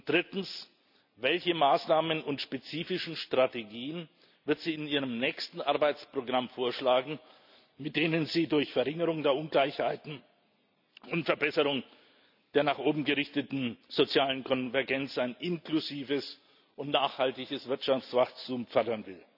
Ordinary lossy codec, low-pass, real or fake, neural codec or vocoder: none; 5.4 kHz; real; none